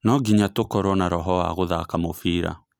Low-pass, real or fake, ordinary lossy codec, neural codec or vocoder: none; real; none; none